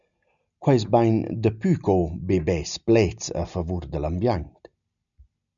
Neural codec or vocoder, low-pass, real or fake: none; 7.2 kHz; real